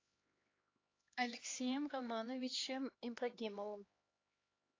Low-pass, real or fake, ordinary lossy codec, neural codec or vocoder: 7.2 kHz; fake; AAC, 32 kbps; codec, 16 kHz, 2 kbps, X-Codec, HuBERT features, trained on LibriSpeech